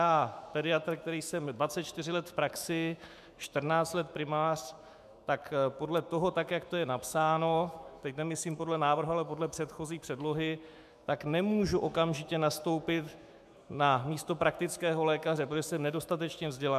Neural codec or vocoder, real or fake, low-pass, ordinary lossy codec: autoencoder, 48 kHz, 128 numbers a frame, DAC-VAE, trained on Japanese speech; fake; 14.4 kHz; MP3, 96 kbps